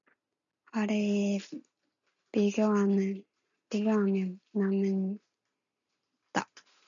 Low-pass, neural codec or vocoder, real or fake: 7.2 kHz; none; real